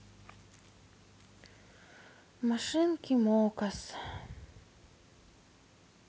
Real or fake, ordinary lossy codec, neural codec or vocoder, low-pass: real; none; none; none